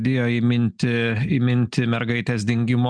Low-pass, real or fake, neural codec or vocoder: 9.9 kHz; real; none